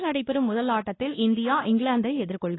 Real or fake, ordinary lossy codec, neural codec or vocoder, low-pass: fake; AAC, 16 kbps; codec, 16 kHz, 2 kbps, X-Codec, WavLM features, trained on Multilingual LibriSpeech; 7.2 kHz